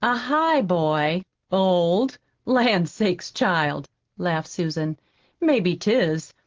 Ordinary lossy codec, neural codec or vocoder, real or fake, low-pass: Opus, 16 kbps; none; real; 7.2 kHz